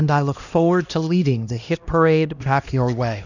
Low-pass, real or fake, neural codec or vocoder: 7.2 kHz; fake; codec, 16 kHz, 1 kbps, X-Codec, HuBERT features, trained on LibriSpeech